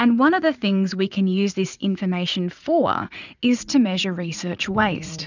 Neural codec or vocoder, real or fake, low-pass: vocoder, 44.1 kHz, 80 mel bands, Vocos; fake; 7.2 kHz